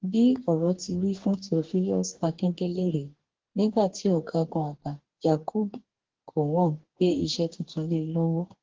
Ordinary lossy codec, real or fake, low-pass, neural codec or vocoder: Opus, 16 kbps; fake; 7.2 kHz; codec, 44.1 kHz, 2.6 kbps, DAC